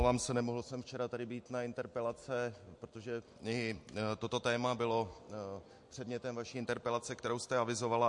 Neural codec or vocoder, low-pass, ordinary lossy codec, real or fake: none; 10.8 kHz; MP3, 48 kbps; real